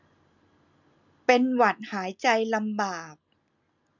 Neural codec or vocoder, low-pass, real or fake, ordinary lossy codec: none; 7.2 kHz; real; none